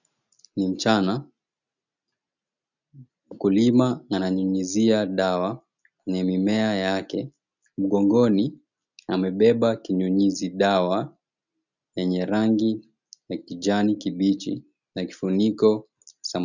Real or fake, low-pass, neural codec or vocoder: real; 7.2 kHz; none